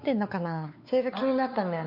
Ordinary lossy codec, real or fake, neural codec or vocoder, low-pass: MP3, 48 kbps; fake; codec, 16 kHz, 4 kbps, X-Codec, WavLM features, trained on Multilingual LibriSpeech; 5.4 kHz